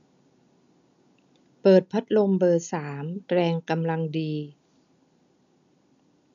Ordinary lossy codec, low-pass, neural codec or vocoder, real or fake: none; 7.2 kHz; none; real